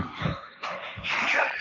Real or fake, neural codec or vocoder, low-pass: fake; codec, 16 kHz, 1.1 kbps, Voila-Tokenizer; 7.2 kHz